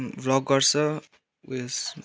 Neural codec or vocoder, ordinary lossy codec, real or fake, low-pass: none; none; real; none